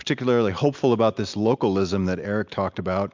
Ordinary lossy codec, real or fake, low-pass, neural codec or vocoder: MP3, 64 kbps; real; 7.2 kHz; none